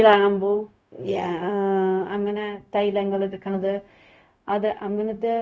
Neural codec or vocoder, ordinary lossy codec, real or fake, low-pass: codec, 16 kHz, 0.4 kbps, LongCat-Audio-Codec; none; fake; none